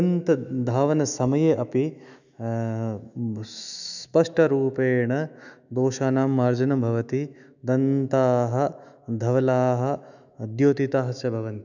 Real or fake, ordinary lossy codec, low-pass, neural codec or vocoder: fake; none; 7.2 kHz; autoencoder, 48 kHz, 128 numbers a frame, DAC-VAE, trained on Japanese speech